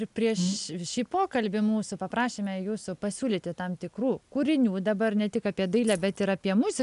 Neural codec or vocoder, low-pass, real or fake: none; 10.8 kHz; real